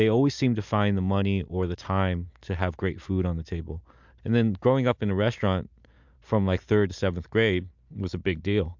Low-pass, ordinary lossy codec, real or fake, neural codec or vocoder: 7.2 kHz; MP3, 64 kbps; real; none